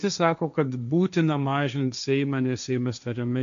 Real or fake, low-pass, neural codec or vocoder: fake; 7.2 kHz; codec, 16 kHz, 1.1 kbps, Voila-Tokenizer